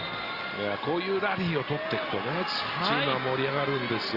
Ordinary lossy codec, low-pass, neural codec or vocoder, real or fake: Opus, 32 kbps; 5.4 kHz; none; real